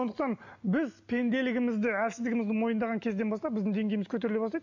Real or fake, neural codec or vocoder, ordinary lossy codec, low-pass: real; none; none; 7.2 kHz